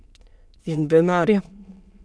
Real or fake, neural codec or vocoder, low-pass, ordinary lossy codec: fake; autoencoder, 22.05 kHz, a latent of 192 numbers a frame, VITS, trained on many speakers; none; none